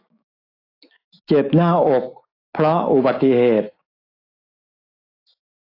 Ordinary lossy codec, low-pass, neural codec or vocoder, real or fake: AAC, 24 kbps; 5.4 kHz; autoencoder, 48 kHz, 128 numbers a frame, DAC-VAE, trained on Japanese speech; fake